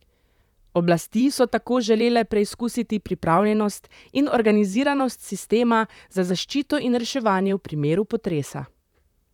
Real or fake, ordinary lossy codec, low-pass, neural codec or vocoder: fake; none; 19.8 kHz; vocoder, 44.1 kHz, 128 mel bands, Pupu-Vocoder